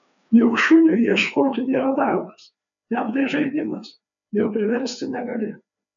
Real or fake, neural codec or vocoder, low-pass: fake; codec, 16 kHz, 2 kbps, FreqCodec, larger model; 7.2 kHz